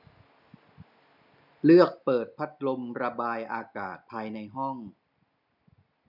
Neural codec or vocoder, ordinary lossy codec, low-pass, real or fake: none; none; 5.4 kHz; real